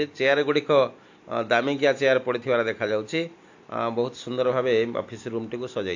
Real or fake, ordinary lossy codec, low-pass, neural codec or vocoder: real; AAC, 48 kbps; 7.2 kHz; none